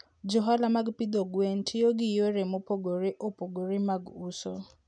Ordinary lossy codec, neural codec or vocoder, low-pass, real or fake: none; none; 9.9 kHz; real